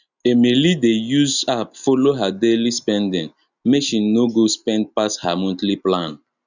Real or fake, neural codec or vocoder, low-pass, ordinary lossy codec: real; none; 7.2 kHz; none